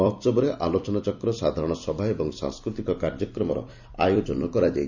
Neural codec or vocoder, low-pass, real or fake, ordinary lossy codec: vocoder, 44.1 kHz, 128 mel bands every 256 samples, BigVGAN v2; 7.2 kHz; fake; none